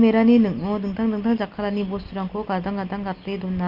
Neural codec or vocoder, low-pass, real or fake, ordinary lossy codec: none; 5.4 kHz; real; Opus, 24 kbps